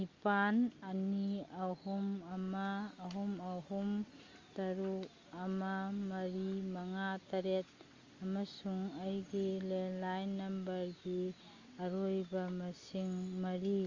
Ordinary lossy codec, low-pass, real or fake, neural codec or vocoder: Opus, 32 kbps; 7.2 kHz; real; none